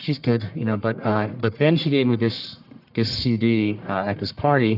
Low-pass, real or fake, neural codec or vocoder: 5.4 kHz; fake; codec, 44.1 kHz, 1.7 kbps, Pupu-Codec